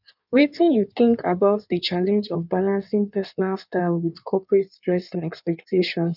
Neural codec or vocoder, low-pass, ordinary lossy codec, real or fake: codec, 16 kHz in and 24 kHz out, 1.1 kbps, FireRedTTS-2 codec; 5.4 kHz; none; fake